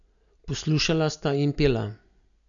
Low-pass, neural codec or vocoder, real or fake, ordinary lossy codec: 7.2 kHz; none; real; none